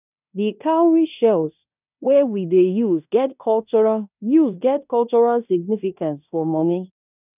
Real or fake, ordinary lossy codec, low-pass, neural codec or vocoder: fake; none; 3.6 kHz; codec, 16 kHz in and 24 kHz out, 0.9 kbps, LongCat-Audio-Codec, fine tuned four codebook decoder